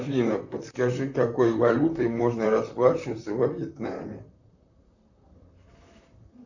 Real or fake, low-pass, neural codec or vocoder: fake; 7.2 kHz; vocoder, 44.1 kHz, 128 mel bands, Pupu-Vocoder